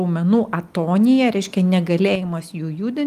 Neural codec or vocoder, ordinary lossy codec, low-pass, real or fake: none; Opus, 32 kbps; 14.4 kHz; real